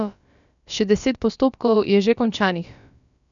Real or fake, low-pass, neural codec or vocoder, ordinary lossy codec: fake; 7.2 kHz; codec, 16 kHz, about 1 kbps, DyCAST, with the encoder's durations; none